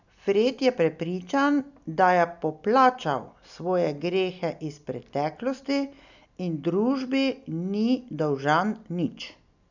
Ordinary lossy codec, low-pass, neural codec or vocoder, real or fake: none; 7.2 kHz; none; real